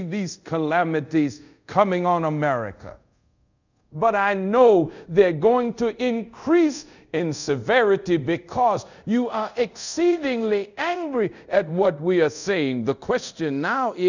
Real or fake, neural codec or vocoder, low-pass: fake; codec, 24 kHz, 0.5 kbps, DualCodec; 7.2 kHz